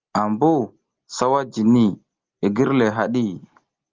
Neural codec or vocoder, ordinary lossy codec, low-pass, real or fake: none; Opus, 32 kbps; 7.2 kHz; real